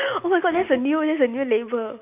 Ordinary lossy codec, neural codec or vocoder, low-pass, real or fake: none; none; 3.6 kHz; real